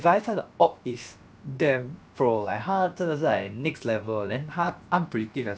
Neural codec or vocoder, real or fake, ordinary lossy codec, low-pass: codec, 16 kHz, about 1 kbps, DyCAST, with the encoder's durations; fake; none; none